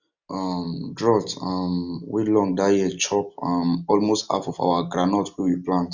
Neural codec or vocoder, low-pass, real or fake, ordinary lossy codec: none; 7.2 kHz; real; Opus, 64 kbps